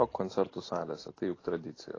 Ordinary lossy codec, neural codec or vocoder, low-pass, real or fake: AAC, 32 kbps; none; 7.2 kHz; real